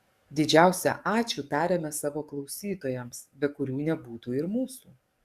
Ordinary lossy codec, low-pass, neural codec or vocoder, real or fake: Opus, 64 kbps; 14.4 kHz; codec, 44.1 kHz, 7.8 kbps, DAC; fake